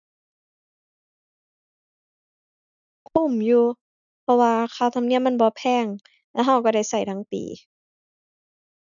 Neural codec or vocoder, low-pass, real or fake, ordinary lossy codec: none; 7.2 kHz; real; none